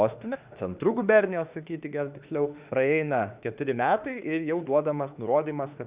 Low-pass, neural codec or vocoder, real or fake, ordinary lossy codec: 3.6 kHz; autoencoder, 48 kHz, 32 numbers a frame, DAC-VAE, trained on Japanese speech; fake; Opus, 64 kbps